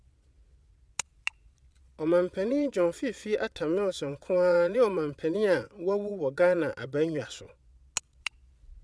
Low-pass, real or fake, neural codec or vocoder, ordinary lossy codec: none; fake; vocoder, 22.05 kHz, 80 mel bands, Vocos; none